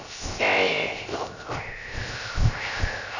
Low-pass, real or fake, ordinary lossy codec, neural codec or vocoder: 7.2 kHz; fake; none; codec, 16 kHz, 0.3 kbps, FocalCodec